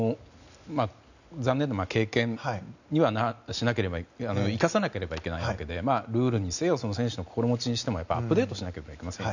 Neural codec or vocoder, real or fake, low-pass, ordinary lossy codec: none; real; 7.2 kHz; none